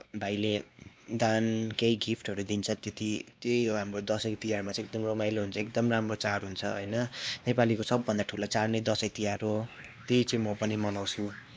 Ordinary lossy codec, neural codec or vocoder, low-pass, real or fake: none; codec, 16 kHz, 2 kbps, X-Codec, WavLM features, trained on Multilingual LibriSpeech; none; fake